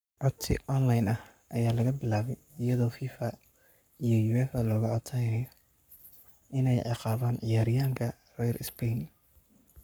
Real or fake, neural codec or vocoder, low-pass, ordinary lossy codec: fake; codec, 44.1 kHz, 7.8 kbps, Pupu-Codec; none; none